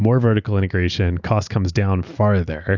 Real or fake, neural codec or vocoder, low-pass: real; none; 7.2 kHz